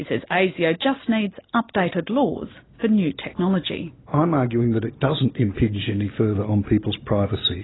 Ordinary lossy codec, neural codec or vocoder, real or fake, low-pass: AAC, 16 kbps; none; real; 7.2 kHz